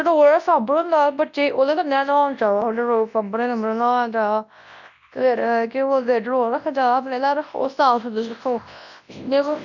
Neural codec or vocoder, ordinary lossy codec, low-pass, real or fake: codec, 24 kHz, 0.9 kbps, WavTokenizer, large speech release; MP3, 64 kbps; 7.2 kHz; fake